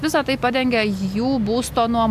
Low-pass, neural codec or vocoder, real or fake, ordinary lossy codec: 14.4 kHz; none; real; AAC, 96 kbps